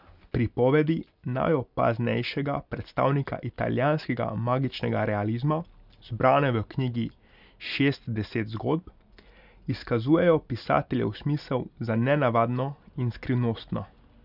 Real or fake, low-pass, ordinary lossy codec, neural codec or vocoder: real; 5.4 kHz; none; none